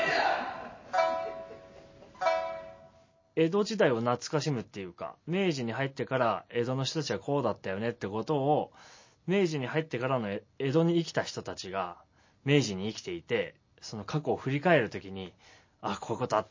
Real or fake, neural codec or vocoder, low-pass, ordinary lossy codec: real; none; 7.2 kHz; MP3, 32 kbps